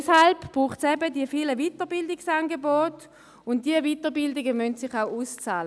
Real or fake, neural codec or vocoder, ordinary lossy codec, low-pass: real; none; none; none